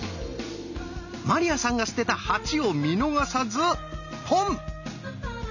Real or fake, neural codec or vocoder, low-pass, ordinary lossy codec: real; none; 7.2 kHz; none